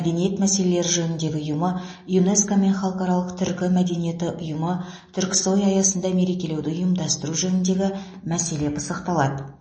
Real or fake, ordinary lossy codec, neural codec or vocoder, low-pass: real; MP3, 32 kbps; none; 9.9 kHz